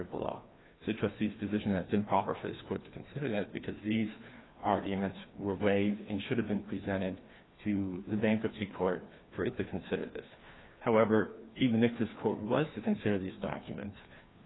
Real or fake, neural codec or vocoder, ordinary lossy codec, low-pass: fake; codec, 16 kHz, 2 kbps, FreqCodec, larger model; AAC, 16 kbps; 7.2 kHz